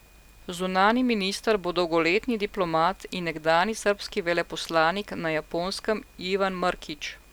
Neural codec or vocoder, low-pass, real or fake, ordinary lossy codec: none; none; real; none